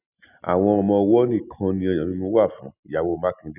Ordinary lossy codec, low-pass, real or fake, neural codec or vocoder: none; 3.6 kHz; real; none